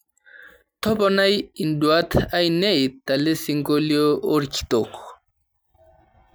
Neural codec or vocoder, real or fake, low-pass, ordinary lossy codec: none; real; none; none